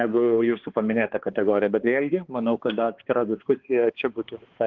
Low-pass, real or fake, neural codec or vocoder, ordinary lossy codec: 7.2 kHz; fake; codec, 16 kHz, 2 kbps, X-Codec, HuBERT features, trained on balanced general audio; Opus, 16 kbps